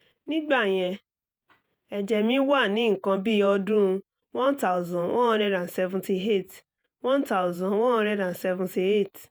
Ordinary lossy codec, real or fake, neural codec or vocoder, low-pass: none; fake; vocoder, 48 kHz, 128 mel bands, Vocos; none